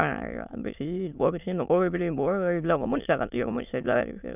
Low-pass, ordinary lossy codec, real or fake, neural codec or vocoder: 3.6 kHz; none; fake; autoencoder, 22.05 kHz, a latent of 192 numbers a frame, VITS, trained on many speakers